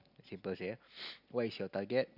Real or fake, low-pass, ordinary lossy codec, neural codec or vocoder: real; 5.4 kHz; none; none